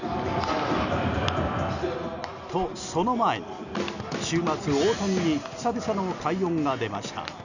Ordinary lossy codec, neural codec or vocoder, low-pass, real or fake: none; none; 7.2 kHz; real